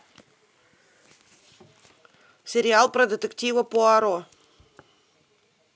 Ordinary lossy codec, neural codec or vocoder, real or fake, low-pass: none; none; real; none